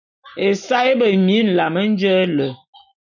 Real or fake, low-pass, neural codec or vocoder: real; 7.2 kHz; none